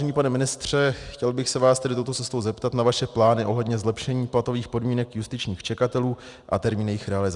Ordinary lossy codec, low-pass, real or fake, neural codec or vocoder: Opus, 64 kbps; 10.8 kHz; real; none